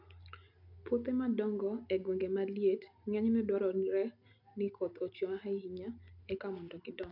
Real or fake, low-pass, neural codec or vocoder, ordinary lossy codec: real; 5.4 kHz; none; none